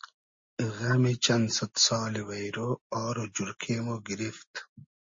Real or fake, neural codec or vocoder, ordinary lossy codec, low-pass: real; none; MP3, 32 kbps; 7.2 kHz